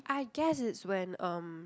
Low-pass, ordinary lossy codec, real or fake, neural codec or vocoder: none; none; real; none